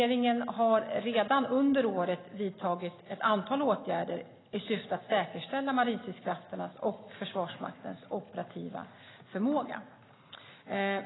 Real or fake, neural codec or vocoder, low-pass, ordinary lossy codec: real; none; 7.2 kHz; AAC, 16 kbps